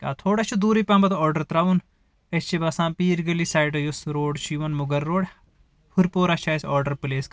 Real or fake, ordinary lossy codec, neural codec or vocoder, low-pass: real; none; none; none